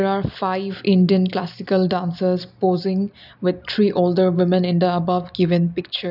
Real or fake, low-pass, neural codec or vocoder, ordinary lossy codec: real; 5.4 kHz; none; none